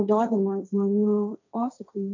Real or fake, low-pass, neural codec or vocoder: fake; 7.2 kHz; codec, 16 kHz, 1.1 kbps, Voila-Tokenizer